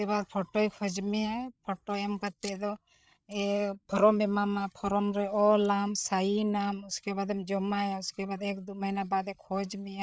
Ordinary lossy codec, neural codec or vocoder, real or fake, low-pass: none; codec, 16 kHz, 8 kbps, FreqCodec, larger model; fake; none